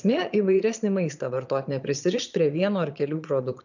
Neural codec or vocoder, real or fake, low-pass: vocoder, 22.05 kHz, 80 mel bands, Vocos; fake; 7.2 kHz